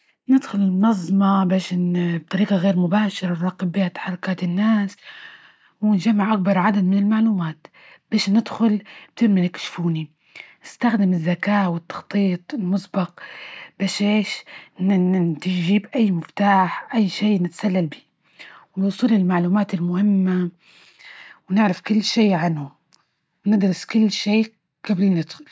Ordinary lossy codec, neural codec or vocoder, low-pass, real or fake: none; none; none; real